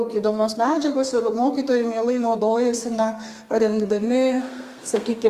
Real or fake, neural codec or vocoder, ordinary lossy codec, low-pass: fake; codec, 32 kHz, 1.9 kbps, SNAC; Opus, 32 kbps; 14.4 kHz